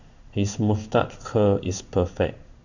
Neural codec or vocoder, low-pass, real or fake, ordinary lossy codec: codec, 16 kHz in and 24 kHz out, 1 kbps, XY-Tokenizer; 7.2 kHz; fake; Opus, 64 kbps